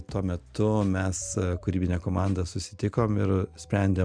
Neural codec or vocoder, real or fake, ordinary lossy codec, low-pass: none; real; Opus, 64 kbps; 9.9 kHz